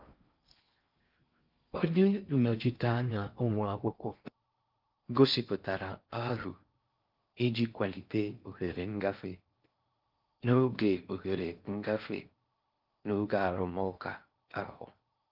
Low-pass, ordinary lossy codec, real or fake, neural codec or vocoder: 5.4 kHz; Opus, 24 kbps; fake; codec, 16 kHz in and 24 kHz out, 0.6 kbps, FocalCodec, streaming, 4096 codes